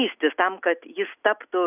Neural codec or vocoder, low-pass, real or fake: none; 3.6 kHz; real